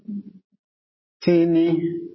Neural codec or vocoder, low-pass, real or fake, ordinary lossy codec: none; 7.2 kHz; real; MP3, 24 kbps